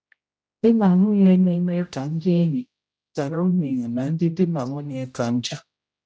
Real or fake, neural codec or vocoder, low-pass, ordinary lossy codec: fake; codec, 16 kHz, 0.5 kbps, X-Codec, HuBERT features, trained on general audio; none; none